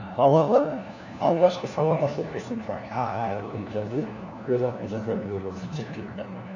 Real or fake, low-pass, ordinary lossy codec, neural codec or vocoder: fake; 7.2 kHz; none; codec, 16 kHz, 1 kbps, FunCodec, trained on LibriTTS, 50 frames a second